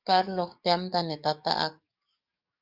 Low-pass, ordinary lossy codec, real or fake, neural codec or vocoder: 5.4 kHz; Opus, 64 kbps; fake; codec, 44.1 kHz, 7.8 kbps, DAC